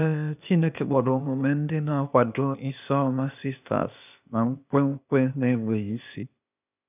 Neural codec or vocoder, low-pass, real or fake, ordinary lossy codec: codec, 16 kHz, 0.8 kbps, ZipCodec; 3.6 kHz; fake; none